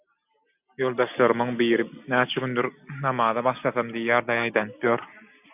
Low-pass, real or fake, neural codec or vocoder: 3.6 kHz; real; none